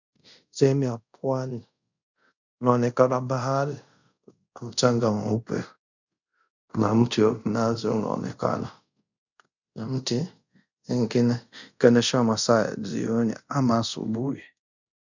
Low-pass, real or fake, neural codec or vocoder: 7.2 kHz; fake; codec, 24 kHz, 0.5 kbps, DualCodec